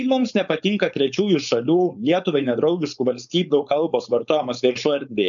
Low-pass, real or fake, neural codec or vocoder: 7.2 kHz; fake; codec, 16 kHz, 4.8 kbps, FACodec